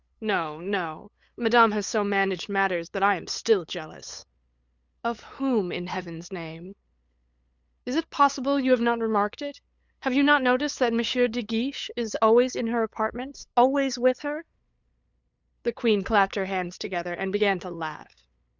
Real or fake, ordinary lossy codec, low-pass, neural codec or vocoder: fake; Opus, 64 kbps; 7.2 kHz; codec, 16 kHz, 8 kbps, FunCodec, trained on LibriTTS, 25 frames a second